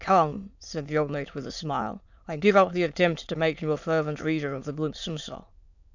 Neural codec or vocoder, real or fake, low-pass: autoencoder, 22.05 kHz, a latent of 192 numbers a frame, VITS, trained on many speakers; fake; 7.2 kHz